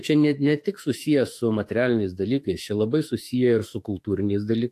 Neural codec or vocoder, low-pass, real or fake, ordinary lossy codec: autoencoder, 48 kHz, 32 numbers a frame, DAC-VAE, trained on Japanese speech; 14.4 kHz; fake; AAC, 64 kbps